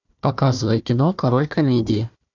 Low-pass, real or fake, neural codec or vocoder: 7.2 kHz; fake; codec, 16 kHz, 1 kbps, FunCodec, trained on Chinese and English, 50 frames a second